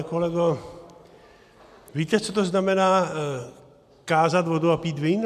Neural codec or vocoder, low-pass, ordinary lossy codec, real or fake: none; 14.4 kHz; MP3, 96 kbps; real